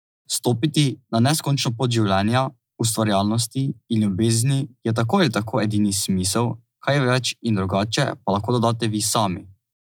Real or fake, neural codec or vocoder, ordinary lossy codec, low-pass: real; none; none; none